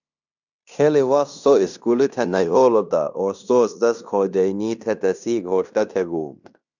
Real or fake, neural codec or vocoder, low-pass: fake; codec, 16 kHz in and 24 kHz out, 0.9 kbps, LongCat-Audio-Codec, fine tuned four codebook decoder; 7.2 kHz